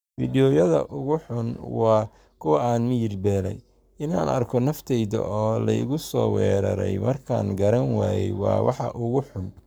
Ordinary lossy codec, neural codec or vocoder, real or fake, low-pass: none; codec, 44.1 kHz, 7.8 kbps, Pupu-Codec; fake; none